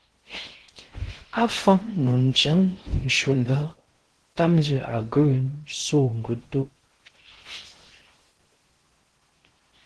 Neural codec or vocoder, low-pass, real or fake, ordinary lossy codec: codec, 16 kHz in and 24 kHz out, 0.6 kbps, FocalCodec, streaming, 4096 codes; 10.8 kHz; fake; Opus, 16 kbps